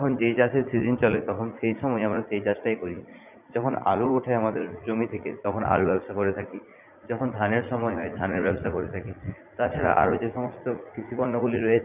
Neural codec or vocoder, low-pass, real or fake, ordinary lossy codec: vocoder, 44.1 kHz, 80 mel bands, Vocos; 3.6 kHz; fake; none